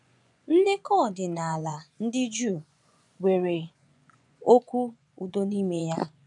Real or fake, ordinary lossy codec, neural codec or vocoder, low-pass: fake; none; vocoder, 24 kHz, 100 mel bands, Vocos; 10.8 kHz